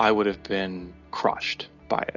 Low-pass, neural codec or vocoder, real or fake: 7.2 kHz; none; real